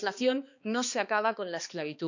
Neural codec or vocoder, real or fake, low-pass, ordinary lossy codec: codec, 16 kHz, 2 kbps, X-Codec, HuBERT features, trained on balanced general audio; fake; 7.2 kHz; none